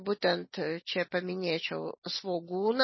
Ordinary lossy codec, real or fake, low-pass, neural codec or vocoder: MP3, 24 kbps; real; 7.2 kHz; none